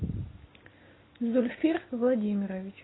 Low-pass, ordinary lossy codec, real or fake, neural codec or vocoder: 7.2 kHz; AAC, 16 kbps; real; none